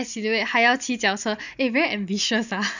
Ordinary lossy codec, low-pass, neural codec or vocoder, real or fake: none; 7.2 kHz; none; real